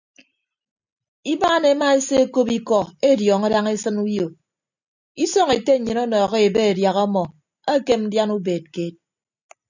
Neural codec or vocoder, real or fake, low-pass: none; real; 7.2 kHz